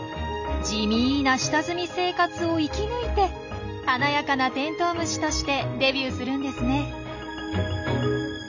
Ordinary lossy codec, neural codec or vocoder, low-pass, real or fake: none; none; 7.2 kHz; real